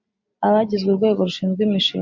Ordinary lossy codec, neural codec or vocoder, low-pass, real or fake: MP3, 48 kbps; none; 7.2 kHz; real